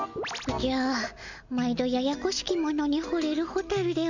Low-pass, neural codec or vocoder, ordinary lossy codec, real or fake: 7.2 kHz; none; none; real